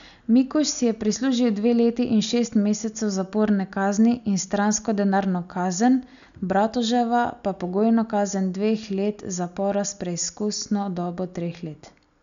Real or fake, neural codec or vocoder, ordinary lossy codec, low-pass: real; none; none; 7.2 kHz